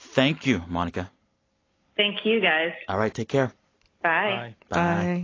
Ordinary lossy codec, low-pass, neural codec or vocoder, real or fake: AAC, 32 kbps; 7.2 kHz; none; real